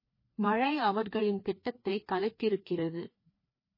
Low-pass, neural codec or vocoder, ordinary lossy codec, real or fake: 5.4 kHz; codec, 16 kHz, 2 kbps, FreqCodec, larger model; MP3, 24 kbps; fake